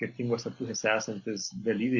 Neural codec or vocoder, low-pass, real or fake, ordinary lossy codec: none; 7.2 kHz; real; Opus, 64 kbps